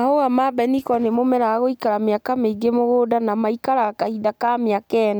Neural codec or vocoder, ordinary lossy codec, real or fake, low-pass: none; none; real; none